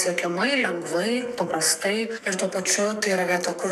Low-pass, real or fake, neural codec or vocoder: 14.4 kHz; fake; codec, 44.1 kHz, 2.6 kbps, SNAC